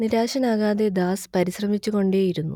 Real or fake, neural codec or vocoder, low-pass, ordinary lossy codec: real; none; 19.8 kHz; none